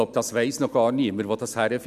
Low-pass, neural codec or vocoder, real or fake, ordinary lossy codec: 14.4 kHz; none; real; none